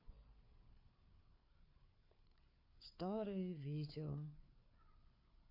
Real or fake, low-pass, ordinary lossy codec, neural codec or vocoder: fake; 5.4 kHz; AAC, 32 kbps; codec, 16 kHz, 4 kbps, FreqCodec, larger model